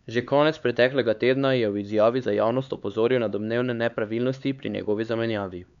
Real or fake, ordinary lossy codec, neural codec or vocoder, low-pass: fake; none; codec, 16 kHz, 4 kbps, X-Codec, HuBERT features, trained on LibriSpeech; 7.2 kHz